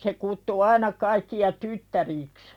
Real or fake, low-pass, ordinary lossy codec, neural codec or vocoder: real; 19.8 kHz; none; none